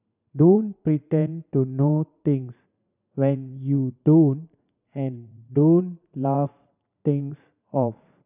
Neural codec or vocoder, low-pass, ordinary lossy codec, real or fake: vocoder, 44.1 kHz, 80 mel bands, Vocos; 3.6 kHz; none; fake